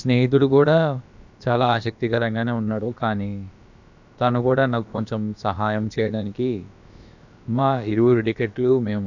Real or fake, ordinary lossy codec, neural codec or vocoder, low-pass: fake; none; codec, 16 kHz, about 1 kbps, DyCAST, with the encoder's durations; 7.2 kHz